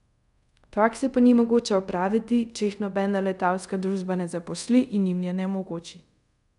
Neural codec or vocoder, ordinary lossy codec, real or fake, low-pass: codec, 24 kHz, 0.5 kbps, DualCodec; none; fake; 10.8 kHz